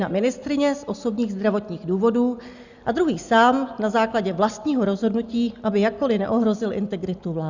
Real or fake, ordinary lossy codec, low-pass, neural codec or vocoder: real; Opus, 64 kbps; 7.2 kHz; none